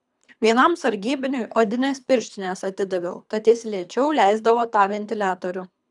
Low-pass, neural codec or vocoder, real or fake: 10.8 kHz; codec, 24 kHz, 3 kbps, HILCodec; fake